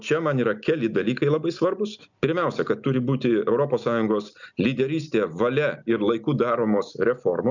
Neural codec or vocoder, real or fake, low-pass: none; real; 7.2 kHz